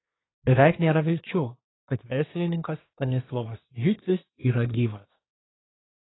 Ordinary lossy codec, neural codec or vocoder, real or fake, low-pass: AAC, 16 kbps; codec, 24 kHz, 1 kbps, SNAC; fake; 7.2 kHz